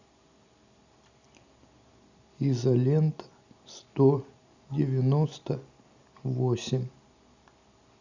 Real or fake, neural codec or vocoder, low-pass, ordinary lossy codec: real; none; 7.2 kHz; Opus, 64 kbps